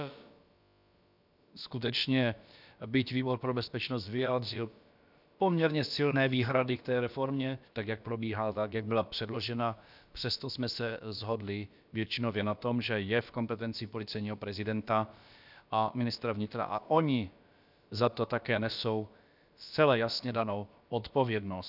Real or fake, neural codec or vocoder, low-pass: fake; codec, 16 kHz, about 1 kbps, DyCAST, with the encoder's durations; 5.4 kHz